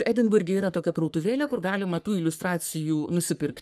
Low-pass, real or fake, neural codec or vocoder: 14.4 kHz; fake; codec, 44.1 kHz, 3.4 kbps, Pupu-Codec